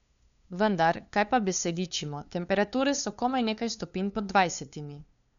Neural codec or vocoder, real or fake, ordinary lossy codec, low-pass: codec, 16 kHz, 2 kbps, FunCodec, trained on LibriTTS, 25 frames a second; fake; none; 7.2 kHz